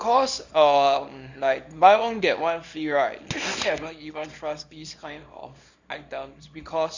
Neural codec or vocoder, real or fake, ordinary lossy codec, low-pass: codec, 24 kHz, 0.9 kbps, WavTokenizer, small release; fake; Opus, 64 kbps; 7.2 kHz